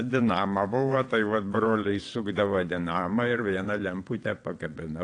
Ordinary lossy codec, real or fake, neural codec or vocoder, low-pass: AAC, 48 kbps; fake; vocoder, 22.05 kHz, 80 mel bands, WaveNeXt; 9.9 kHz